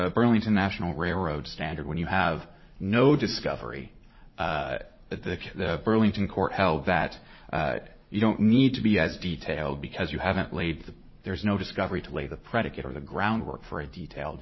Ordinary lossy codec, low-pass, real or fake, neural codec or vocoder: MP3, 24 kbps; 7.2 kHz; fake; vocoder, 44.1 kHz, 80 mel bands, Vocos